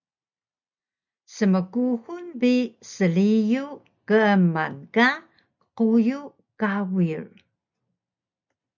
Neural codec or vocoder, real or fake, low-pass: none; real; 7.2 kHz